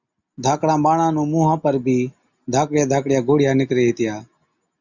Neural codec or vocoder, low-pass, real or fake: none; 7.2 kHz; real